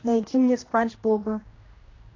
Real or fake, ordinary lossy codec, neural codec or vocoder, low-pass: fake; AAC, 32 kbps; codec, 16 kHz, 1 kbps, X-Codec, HuBERT features, trained on general audio; 7.2 kHz